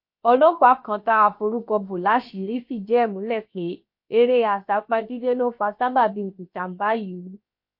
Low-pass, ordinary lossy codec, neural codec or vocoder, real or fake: 5.4 kHz; MP3, 32 kbps; codec, 16 kHz, 0.7 kbps, FocalCodec; fake